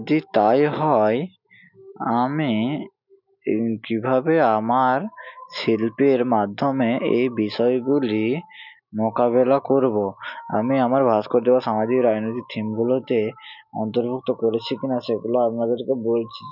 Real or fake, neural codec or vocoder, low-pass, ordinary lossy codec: real; none; 5.4 kHz; none